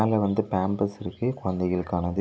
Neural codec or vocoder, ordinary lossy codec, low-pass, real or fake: none; none; none; real